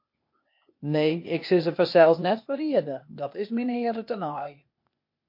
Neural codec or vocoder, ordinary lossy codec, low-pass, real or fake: codec, 16 kHz, 0.8 kbps, ZipCodec; MP3, 32 kbps; 5.4 kHz; fake